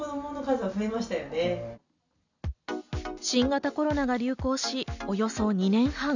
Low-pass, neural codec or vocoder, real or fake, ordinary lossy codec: 7.2 kHz; none; real; none